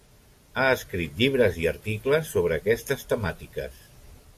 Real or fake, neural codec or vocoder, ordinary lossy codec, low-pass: real; none; AAC, 64 kbps; 14.4 kHz